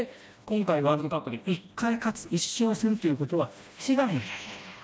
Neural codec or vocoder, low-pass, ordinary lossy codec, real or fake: codec, 16 kHz, 1 kbps, FreqCodec, smaller model; none; none; fake